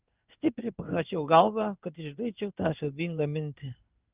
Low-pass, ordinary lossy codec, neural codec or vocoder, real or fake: 3.6 kHz; Opus, 24 kbps; codec, 16 kHz in and 24 kHz out, 1 kbps, XY-Tokenizer; fake